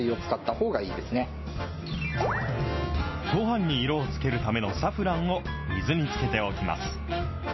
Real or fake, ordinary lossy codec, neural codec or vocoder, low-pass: real; MP3, 24 kbps; none; 7.2 kHz